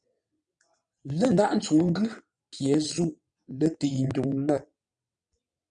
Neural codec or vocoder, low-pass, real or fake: vocoder, 22.05 kHz, 80 mel bands, WaveNeXt; 9.9 kHz; fake